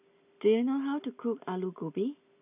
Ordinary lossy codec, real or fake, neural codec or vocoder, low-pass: none; real; none; 3.6 kHz